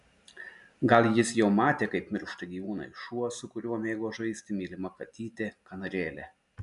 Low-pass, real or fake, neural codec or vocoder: 10.8 kHz; real; none